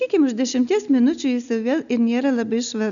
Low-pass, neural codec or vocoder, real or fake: 7.2 kHz; none; real